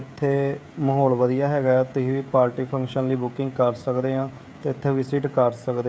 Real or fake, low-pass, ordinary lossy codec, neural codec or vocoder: fake; none; none; codec, 16 kHz, 16 kbps, FreqCodec, smaller model